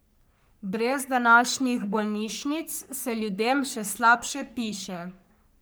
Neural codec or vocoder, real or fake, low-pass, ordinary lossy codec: codec, 44.1 kHz, 3.4 kbps, Pupu-Codec; fake; none; none